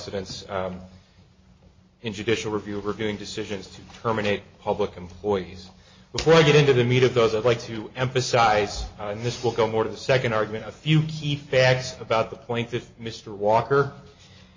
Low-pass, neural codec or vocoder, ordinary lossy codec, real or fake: 7.2 kHz; none; MP3, 32 kbps; real